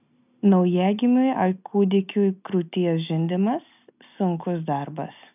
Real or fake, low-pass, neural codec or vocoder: real; 3.6 kHz; none